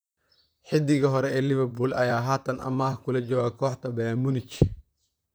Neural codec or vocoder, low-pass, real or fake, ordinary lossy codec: vocoder, 44.1 kHz, 128 mel bands, Pupu-Vocoder; none; fake; none